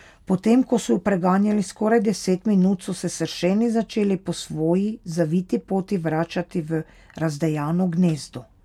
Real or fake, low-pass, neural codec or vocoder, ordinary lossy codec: real; 19.8 kHz; none; none